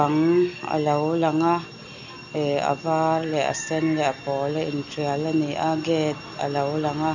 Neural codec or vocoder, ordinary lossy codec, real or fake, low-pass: none; none; real; 7.2 kHz